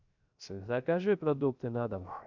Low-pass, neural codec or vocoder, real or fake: 7.2 kHz; codec, 16 kHz, 0.3 kbps, FocalCodec; fake